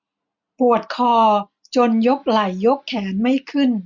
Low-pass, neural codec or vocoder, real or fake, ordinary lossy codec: 7.2 kHz; none; real; none